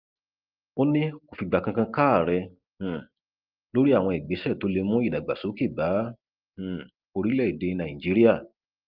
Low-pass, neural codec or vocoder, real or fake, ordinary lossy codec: 5.4 kHz; none; real; Opus, 32 kbps